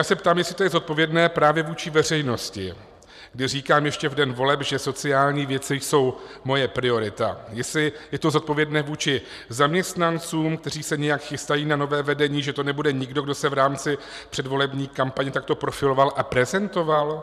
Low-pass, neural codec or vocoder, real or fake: 14.4 kHz; vocoder, 44.1 kHz, 128 mel bands every 512 samples, BigVGAN v2; fake